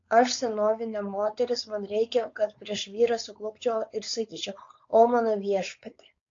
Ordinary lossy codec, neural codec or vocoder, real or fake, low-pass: AAC, 48 kbps; codec, 16 kHz, 4.8 kbps, FACodec; fake; 7.2 kHz